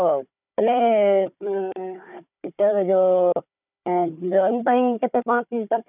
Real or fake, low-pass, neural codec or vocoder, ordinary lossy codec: fake; 3.6 kHz; codec, 16 kHz, 4 kbps, FunCodec, trained on Chinese and English, 50 frames a second; none